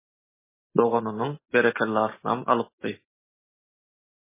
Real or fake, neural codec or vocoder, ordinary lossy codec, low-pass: real; none; MP3, 16 kbps; 3.6 kHz